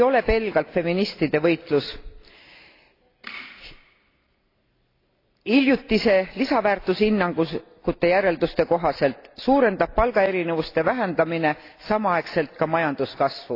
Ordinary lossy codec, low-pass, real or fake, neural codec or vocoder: AAC, 32 kbps; 5.4 kHz; real; none